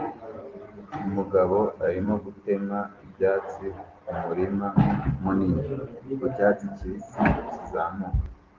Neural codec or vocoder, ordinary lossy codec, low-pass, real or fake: none; Opus, 16 kbps; 7.2 kHz; real